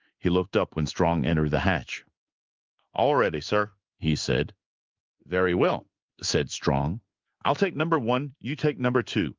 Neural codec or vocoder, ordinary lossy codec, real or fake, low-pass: codec, 16 kHz in and 24 kHz out, 1 kbps, XY-Tokenizer; Opus, 24 kbps; fake; 7.2 kHz